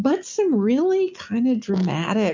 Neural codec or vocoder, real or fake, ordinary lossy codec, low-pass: none; real; MP3, 64 kbps; 7.2 kHz